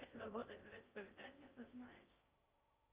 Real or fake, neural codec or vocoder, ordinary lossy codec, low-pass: fake; codec, 16 kHz in and 24 kHz out, 0.6 kbps, FocalCodec, streaming, 4096 codes; Opus, 24 kbps; 3.6 kHz